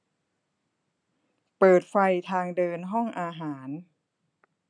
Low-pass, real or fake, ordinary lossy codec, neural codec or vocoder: 9.9 kHz; real; AAC, 64 kbps; none